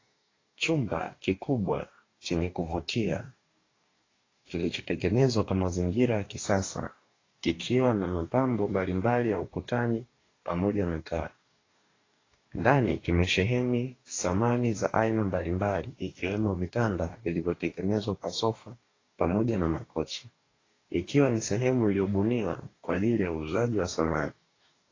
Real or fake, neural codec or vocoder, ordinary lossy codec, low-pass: fake; codec, 44.1 kHz, 2.6 kbps, DAC; AAC, 32 kbps; 7.2 kHz